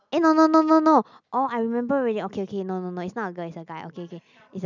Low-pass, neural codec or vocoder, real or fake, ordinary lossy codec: 7.2 kHz; none; real; none